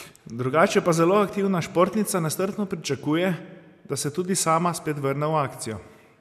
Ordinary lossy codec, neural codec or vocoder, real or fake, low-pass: none; none; real; 14.4 kHz